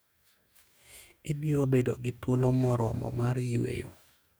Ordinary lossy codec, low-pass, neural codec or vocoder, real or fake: none; none; codec, 44.1 kHz, 2.6 kbps, DAC; fake